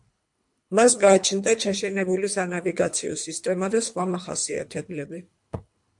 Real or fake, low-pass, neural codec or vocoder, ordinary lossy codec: fake; 10.8 kHz; codec, 24 kHz, 3 kbps, HILCodec; MP3, 64 kbps